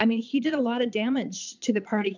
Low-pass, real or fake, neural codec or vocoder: 7.2 kHz; fake; vocoder, 22.05 kHz, 80 mel bands, Vocos